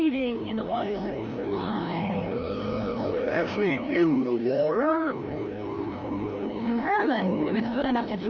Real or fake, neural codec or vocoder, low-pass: fake; codec, 16 kHz, 1 kbps, FreqCodec, larger model; 7.2 kHz